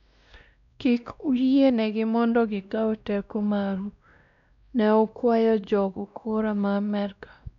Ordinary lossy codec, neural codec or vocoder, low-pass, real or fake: none; codec, 16 kHz, 1 kbps, X-Codec, WavLM features, trained on Multilingual LibriSpeech; 7.2 kHz; fake